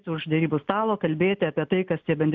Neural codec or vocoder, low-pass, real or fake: none; 7.2 kHz; real